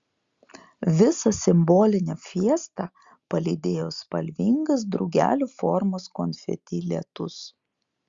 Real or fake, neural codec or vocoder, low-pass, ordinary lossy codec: real; none; 7.2 kHz; Opus, 64 kbps